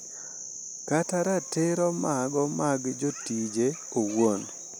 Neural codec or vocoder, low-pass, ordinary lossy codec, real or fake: none; none; none; real